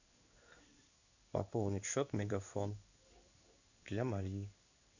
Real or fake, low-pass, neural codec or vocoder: fake; 7.2 kHz; codec, 16 kHz in and 24 kHz out, 1 kbps, XY-Tokenizer